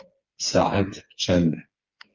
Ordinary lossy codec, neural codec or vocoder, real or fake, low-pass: Opus, 32 kbps; codec, 16 kHz, 4 kbps, FreqCodec, smaller model; fake; 7.2 kHz